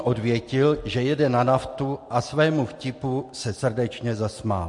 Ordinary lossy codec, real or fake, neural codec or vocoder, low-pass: MP3, 48 kbps; real; none; 10.8 kHz